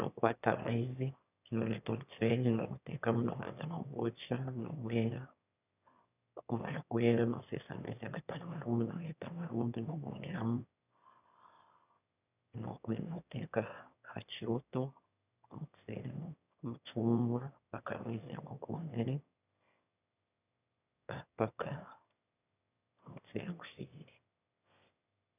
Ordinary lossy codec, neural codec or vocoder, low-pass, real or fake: none; autoencoder, 22.05 kHz, a latent of 192 numbers a frame, VITS, trained on one speaker; 3.6 kHz; fake